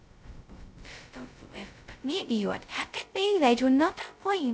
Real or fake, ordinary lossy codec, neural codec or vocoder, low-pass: fake; none; codec, 16 kHz, 0.2 kbps, FocalCodec; none